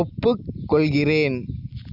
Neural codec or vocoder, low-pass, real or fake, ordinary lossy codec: none; 5.4 kHz; real; none